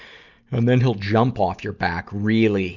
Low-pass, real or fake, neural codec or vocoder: 7.2 kHz; real; none